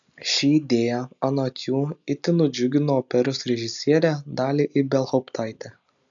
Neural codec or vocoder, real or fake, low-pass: none; real; 7.2 kHz